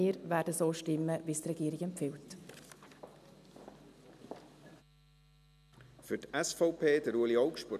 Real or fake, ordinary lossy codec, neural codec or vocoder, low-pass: real; none; none; 14.4 kHz